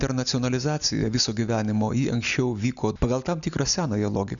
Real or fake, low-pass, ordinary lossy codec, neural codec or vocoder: real; 7.2 kHz; MP3, 96 kbps; none